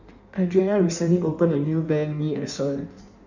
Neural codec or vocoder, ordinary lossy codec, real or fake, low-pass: codec, 16 kHz in and 24 kHz out, 1.1 kbps, FireRedTTS-2 codec; none; fake; 7.2 kHz